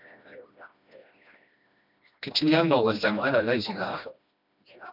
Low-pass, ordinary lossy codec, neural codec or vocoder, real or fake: 5.4 kHz; MP3, 48 kbps; codec, 16 kHz, 1 kbps, FreqCodec, smaller model; fake